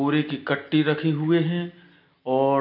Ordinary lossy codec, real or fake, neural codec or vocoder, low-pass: none; real; none; 5.4 kHz